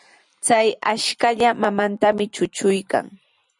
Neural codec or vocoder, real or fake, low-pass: vocoder, 44.1 kHz, 128 mel bands every 256 samples, BigVGAN v2; fake; 10.8 kHz